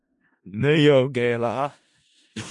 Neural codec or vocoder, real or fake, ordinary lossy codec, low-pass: codec, 16 kHz in and 24 kHz out, 0.4 kbps, LongCat-Audio-Codec, four codebook decoder; fake; MP3, 48 kbps; 10.8 kHz